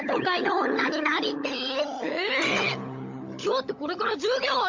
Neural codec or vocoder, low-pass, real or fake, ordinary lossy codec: codec, 16 kHz, 16 kbps, FunCodec, trained on LibriTTS, 50 frames a second; 7.2 kHz; fake; MP3, 64 kbps